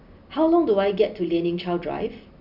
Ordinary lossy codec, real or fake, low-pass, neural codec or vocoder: none; real; 5.4 kHz; none